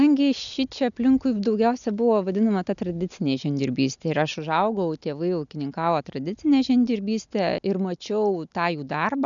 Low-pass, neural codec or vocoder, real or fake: 7.2 kHz; none; real